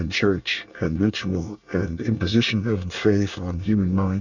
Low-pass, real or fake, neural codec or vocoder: 7.2 kHz; fake; codec, 24 kHz, 1 kbps, SNAC